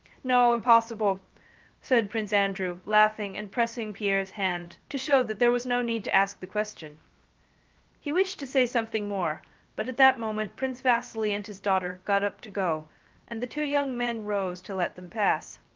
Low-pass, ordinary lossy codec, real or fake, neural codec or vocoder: 7.2 kHz; Opus, 32 kbps; fake; codec, 16 kHz, 0.7 kbps, FocalCodec